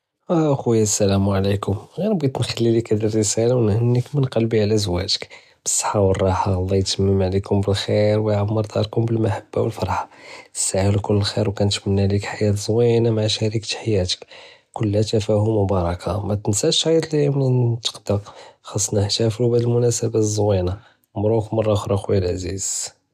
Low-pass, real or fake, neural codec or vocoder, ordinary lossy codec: 14.4 kHz; real; none; none